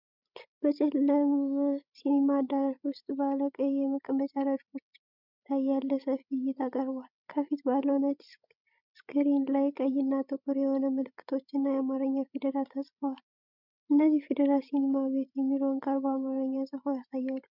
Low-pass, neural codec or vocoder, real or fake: 5.4 kHz; codec, 16 kHz, 16 kbps, FreqCodec, larger model; fake